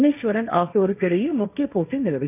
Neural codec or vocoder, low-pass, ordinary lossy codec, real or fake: codec, 16 kHz, 1.1 kbps, Voila-Tokenizer; 3.6 kHz; none; fake